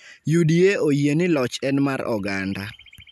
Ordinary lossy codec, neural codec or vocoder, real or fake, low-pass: none; none; real; 14.4 kHz